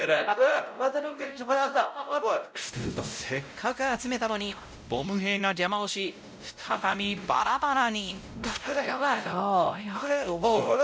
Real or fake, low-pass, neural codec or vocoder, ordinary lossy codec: fake; none; codec, 16 kHz, 0.5 kbps, X-Codec, WavLM features, trained on Multilingual LibriSpeech; none